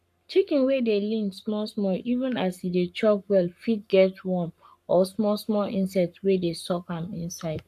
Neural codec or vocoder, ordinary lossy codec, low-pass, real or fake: codec, 44.1 kHz, 7.8 kbps, Pupu-Codec; AAC, 96 kbps; 14.4 kHz; fake